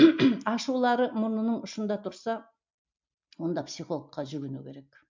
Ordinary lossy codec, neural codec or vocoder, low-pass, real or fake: MP3, 64 kbps; none; 7.2 kHz; real